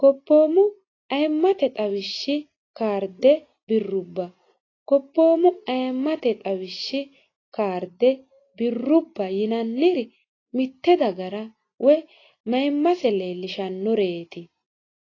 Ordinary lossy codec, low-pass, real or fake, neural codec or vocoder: AAC, 32 kbps; 7.2 kHz; real; none